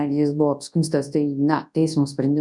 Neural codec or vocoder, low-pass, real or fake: codec, 24 kHz, 0.9 kbps, WavTokenizer, large speech release; 10.8 kHz; fake